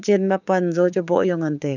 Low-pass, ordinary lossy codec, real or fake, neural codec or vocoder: 7.2 kHz; none; fake; codec, 16 kHz, 6 kbps, DAC